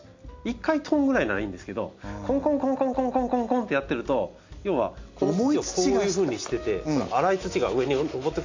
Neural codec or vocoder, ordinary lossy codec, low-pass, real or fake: none; AAC, 48 kbps; 7.2 kHz; real